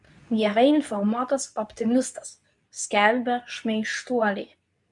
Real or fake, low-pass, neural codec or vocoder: fake; 10.8 kHz; codec, 24 kHz, 0.9 kbps, WavTokenizer, medium speech release version 2